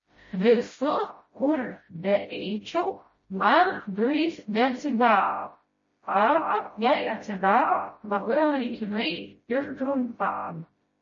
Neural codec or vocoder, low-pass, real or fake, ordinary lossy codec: codec, 16 kHz, 0.5 kbps, FreqCodec, smaller model; 7.2 kHz; fake; MP3, 32 kbps